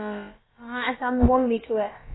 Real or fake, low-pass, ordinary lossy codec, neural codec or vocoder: fake; 7.2 kHz; AAC, 16 kbps; codec, 16 kHz, about 1 kbps, DyCAST, with the encoder's durations